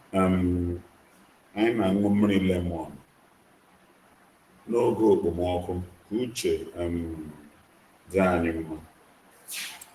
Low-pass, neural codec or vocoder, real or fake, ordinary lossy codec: 14.4 kHz; none; real; Opus, 16 kbps